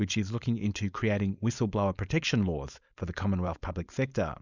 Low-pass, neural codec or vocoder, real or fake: 7.2 kHz; codec, 16 kHz, 4.8 kbps, FACodec; fake